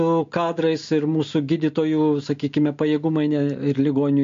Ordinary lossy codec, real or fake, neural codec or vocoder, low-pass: MP3, 48 kbps; real; none; 7.2 kHz